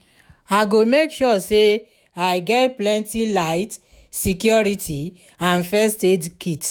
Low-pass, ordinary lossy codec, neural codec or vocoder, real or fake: none; none; autoencoder, 48 kHz, 128 numbers a frame, DAC-VAE, trained on Japanese speech; fake